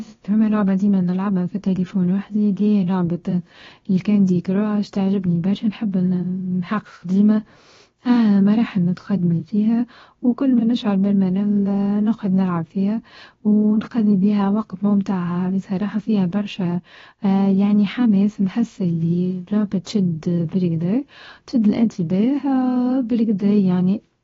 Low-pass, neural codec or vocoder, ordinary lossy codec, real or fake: 7.2 kHz; codec, 16 kHz, about 1 kbps, DyCAST, with the encoder's durations; AAC, 24 kbps; fake